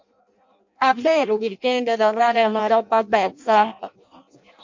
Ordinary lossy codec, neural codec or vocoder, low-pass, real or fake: MP3, 48 kbps; codec, 16 kHz in and 24 kHz out, 0.6 kbps, FireRedTTS-2 codec; 7.2 kHz; fake